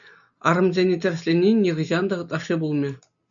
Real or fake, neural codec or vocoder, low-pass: real; none; 7.2 kHz